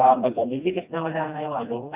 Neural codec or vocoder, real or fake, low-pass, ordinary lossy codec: codec, 16 kHz, 1 kbps, FreqCodec, smaller model; fake; 3.6 kHz; Opus, 64 kbps